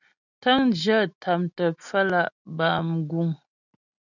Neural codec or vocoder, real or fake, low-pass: none; real; 7.2 kHz